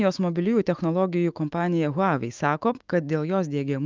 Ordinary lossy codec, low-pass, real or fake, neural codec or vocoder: Opus, 24 kbps; 7.2 kHz; fake; autoencoder, 48 kHz, 128 numbers a frame, DAC-VAE, trained on Japanese speech